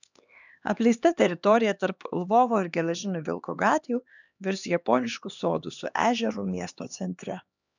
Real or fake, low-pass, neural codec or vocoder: fake; 7.2 kHz; codec, 16 kHz, 2 kbps, X-Codec, HuBERT features, trained on LibriSpeech